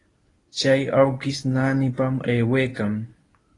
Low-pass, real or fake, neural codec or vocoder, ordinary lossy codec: 10.8 kHz; fake; codec, 24 kHz, 0.9 kbps, WavTokenizer, medium speech release version 1; AAC, 32 kbps